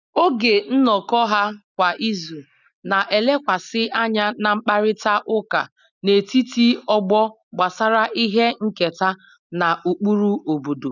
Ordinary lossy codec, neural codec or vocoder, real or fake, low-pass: none; none; real; 7.2 kHz